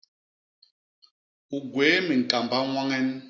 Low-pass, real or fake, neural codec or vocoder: 7.2 kHz; real; none